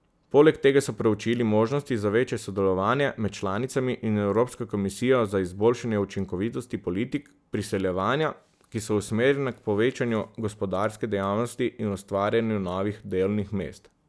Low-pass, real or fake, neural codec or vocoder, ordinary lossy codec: none; real; none; none